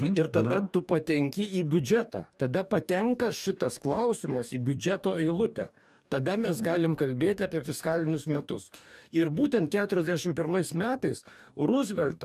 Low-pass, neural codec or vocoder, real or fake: 14.4 kHz; codec, 44.1 kHz, 2.6 kbps, DAC; fake